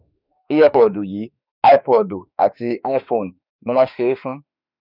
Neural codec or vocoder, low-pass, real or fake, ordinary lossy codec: autoencoder, 48 kHz, 32 numbers a frame, DAC-VAE, trained on Japanese speech; 5.4 kHz; fake; none